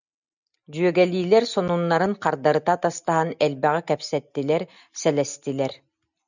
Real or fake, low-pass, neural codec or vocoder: real; 7.2 kHz; none